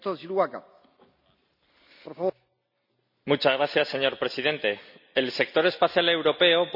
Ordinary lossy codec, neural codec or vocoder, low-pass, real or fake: none; none; 5.4 kHz; real